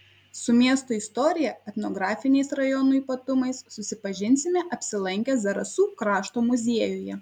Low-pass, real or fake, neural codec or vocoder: 19.8 kHz; real; none